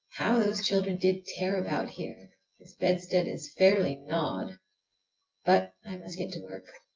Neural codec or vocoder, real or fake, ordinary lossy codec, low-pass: vocoder, 24 kHz, 100 mel bands, Vocos; fake; Opus, 32 kbps; 7.2 kHz